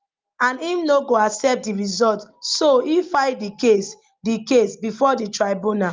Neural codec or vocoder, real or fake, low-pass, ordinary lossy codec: none; real; 7.2 kHz; Opus, 32 kbps